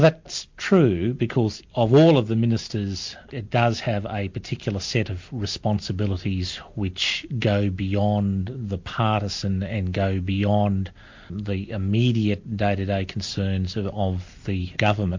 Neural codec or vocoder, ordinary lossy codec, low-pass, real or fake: none; MP3, 48 kbps; 7.2 kHz; real